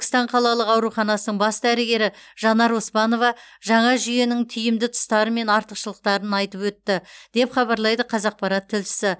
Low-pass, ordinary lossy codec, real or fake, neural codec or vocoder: none; none; real; none